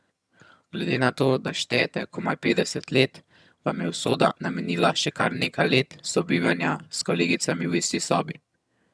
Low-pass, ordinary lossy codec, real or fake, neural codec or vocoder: none; none; fake; vocoder, 22.05 kHz, 80 mel bands, HiFi-GAN